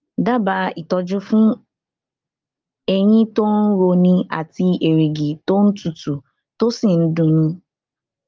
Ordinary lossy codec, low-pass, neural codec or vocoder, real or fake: Opus, 24 kbps; 7.2 kHz; none; real